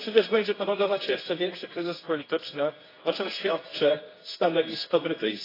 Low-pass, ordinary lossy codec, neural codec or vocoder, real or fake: 5.4 kHz; AAC, 24 kbps; codec, 24 kHz, 0.9 kbps, WavTokenizer, medium music audio release; fake